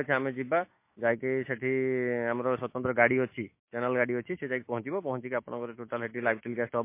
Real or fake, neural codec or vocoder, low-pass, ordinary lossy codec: fake; autoencoder, 48 kHz, 128 numbers a frame, DAC-VAE, trained on Japanese speech; 3.6 kHz; MP3, 24 kbps